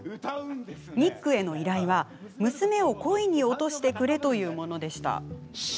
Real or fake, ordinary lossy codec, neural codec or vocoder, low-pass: real; none; none; none